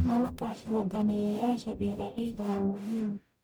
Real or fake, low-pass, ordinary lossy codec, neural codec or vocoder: fake; none; none; codec, 44.1 kHz, 0.9 kbps, DAC